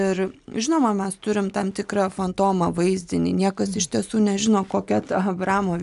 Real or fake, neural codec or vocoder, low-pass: real; none; 10.8 kHz